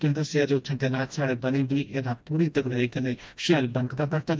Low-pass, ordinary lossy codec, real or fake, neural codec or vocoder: none; none; fake; codec, 16 kHz, 1 kbps, FreqCodec, smaller model